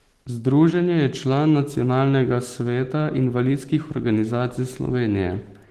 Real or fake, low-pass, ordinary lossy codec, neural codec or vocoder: fake; 14.4 kHz; Opus, 16 kbps; autoencoder, 48 kHz, 128 numbers a frame, DAC-VAE, trained on Japanese speech